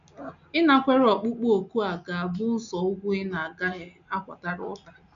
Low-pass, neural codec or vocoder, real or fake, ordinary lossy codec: 7.2 kHz; none; real; none